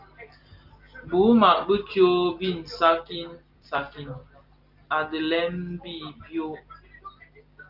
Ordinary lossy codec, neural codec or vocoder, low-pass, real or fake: Opus, 24 kbps; none; 5.4 kHz; real